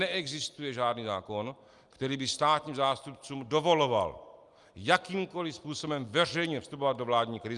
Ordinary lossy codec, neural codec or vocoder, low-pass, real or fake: Opus, 32 kbps; none; 10.8 kHz; real